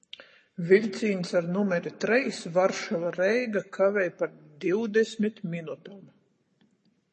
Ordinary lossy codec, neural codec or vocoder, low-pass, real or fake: MP3, 32 kbps; codec, 44.1 kHz, 7.8 kbps, Pupu-Codec; 10.8 kHz; fake